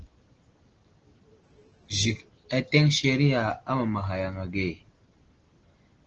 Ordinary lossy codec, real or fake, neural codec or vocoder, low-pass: Opus, 16 kbps; real; none; 7.2 kHz